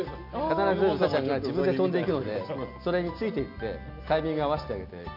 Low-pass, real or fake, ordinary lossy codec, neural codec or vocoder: 5.4 kHz; real; none; none